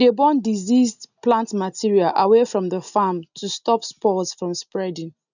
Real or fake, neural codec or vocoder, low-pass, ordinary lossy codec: real; none; 7.2 kHz; none